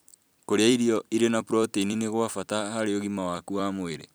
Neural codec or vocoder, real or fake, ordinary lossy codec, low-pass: vocoder, 44.1 kHz, 128 mel bands every 256 samples, BigVGAN v2; fake; none; none